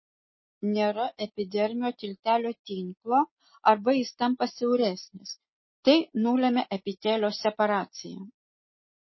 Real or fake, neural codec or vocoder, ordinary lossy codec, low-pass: real; none; MP3, 24 kbps; 7.2 kHz